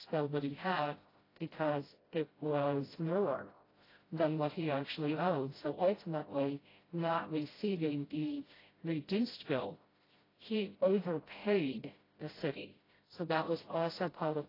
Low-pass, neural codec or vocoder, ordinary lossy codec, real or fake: 5.4 kHz; codec, 16 kHz, 0.5 kbps, FreqCodec, smaller model; AAC, 24 kbps; fake